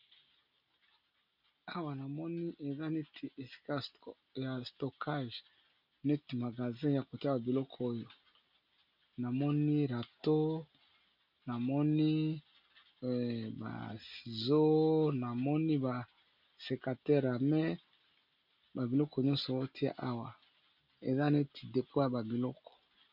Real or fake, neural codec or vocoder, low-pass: real; none; 5.4 kHz